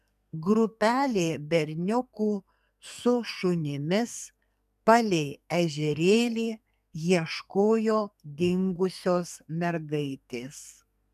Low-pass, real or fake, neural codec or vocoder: 14.4 kHz; fake; codec, 44.1 kHz, 2.6 kbps, SNAC